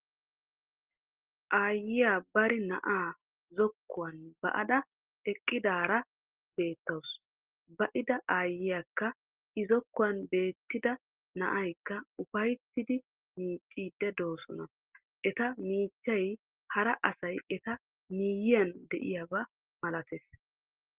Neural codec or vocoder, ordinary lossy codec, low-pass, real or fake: none; Opus, 16 kbps; 3.6 kHz; real